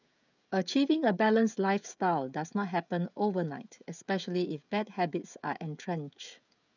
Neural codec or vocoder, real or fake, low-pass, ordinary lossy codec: codec, 16 kHz, 16 kbps, FreqCodec, smaller model; fake; 7.2 kHz; none